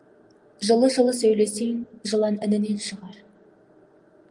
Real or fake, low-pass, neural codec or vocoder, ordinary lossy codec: real; 10.8 kHz; none; Opus, 32 kbps